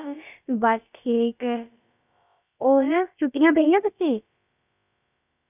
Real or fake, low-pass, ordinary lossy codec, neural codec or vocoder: fake; 3.6 kHz; none; codec, 16 kHz, about 1 kbps, DyCAST, with the encoder's durations